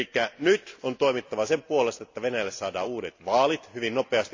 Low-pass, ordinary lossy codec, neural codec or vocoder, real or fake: 7.2 kHz; AAC, 48 kbps; none; real